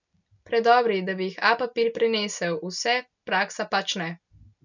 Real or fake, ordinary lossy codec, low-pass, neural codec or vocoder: real; none; 7.2 kHz; none